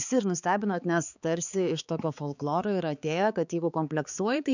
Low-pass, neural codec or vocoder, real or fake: 7.2 kHz; codec, 16 kHz, 4 kbps, X-Codec, HuBERT features, trained on balanced general audio; fake